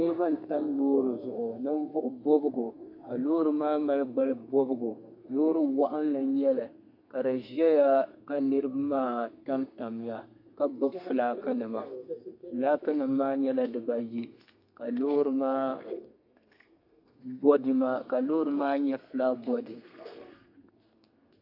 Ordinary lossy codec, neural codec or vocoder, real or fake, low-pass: AAC, 48 kbps; codec, 32 kHz, 1.9 kbps, SNAC; fake; 5.4 kHz